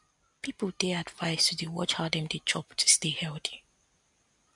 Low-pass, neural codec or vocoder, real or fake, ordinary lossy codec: 10.8 kHz; none; real; MP3, 64 kbps